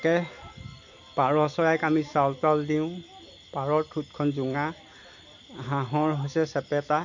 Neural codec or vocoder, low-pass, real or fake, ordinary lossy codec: none; 7.2 kHz; real; MP3, 48 kbps